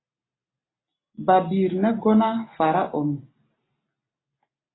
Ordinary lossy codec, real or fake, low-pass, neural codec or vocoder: AAC, 16 kbps; real; 7.2 kHz; none